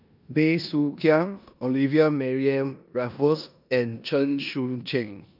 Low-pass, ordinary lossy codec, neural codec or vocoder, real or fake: 5.4 kHz; none; codec, 16 kHz in and 24 kHz out, 0.9 kbps, LongCat-Audio-Codec, four codebook decoder; fake